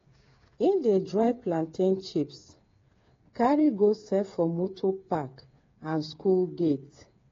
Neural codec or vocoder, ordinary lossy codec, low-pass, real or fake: codec, 16 kHz, 8 kbps, FreqCodec, smaller model; AAC, 32 kbps; 7.2 kHz; fake